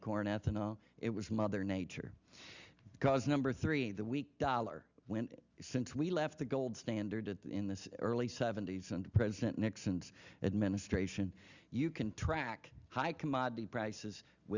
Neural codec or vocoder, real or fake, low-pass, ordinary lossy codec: none; real; 7.2 kHz; Opus, 64 kbps